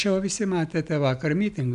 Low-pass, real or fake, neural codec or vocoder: 10.8 kHz; real; none